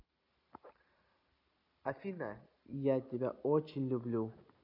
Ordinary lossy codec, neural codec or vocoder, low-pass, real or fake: none; none; 5.4 kHz; real